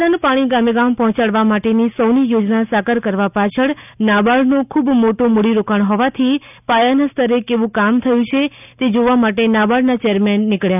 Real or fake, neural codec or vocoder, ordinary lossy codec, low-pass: real; none; none; 3.6 kHz